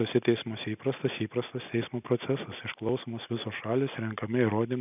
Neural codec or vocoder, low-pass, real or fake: none; 3.6 kHz; real